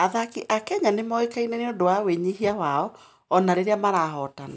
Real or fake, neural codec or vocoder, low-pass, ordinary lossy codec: real; none; none; none